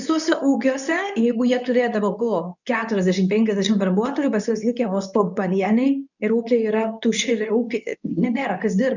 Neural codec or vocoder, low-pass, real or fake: codec, 24 kHz, 0.9 kbps, WavTokenizer, medium speech release version 2; 7.2 kHz; fake